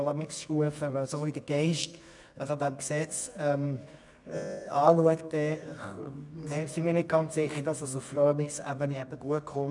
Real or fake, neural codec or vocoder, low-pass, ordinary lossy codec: fake; codec, 24 kHz, 0.9 kbps, WavTokenizer, medium music audio release; 10.8 kHz; none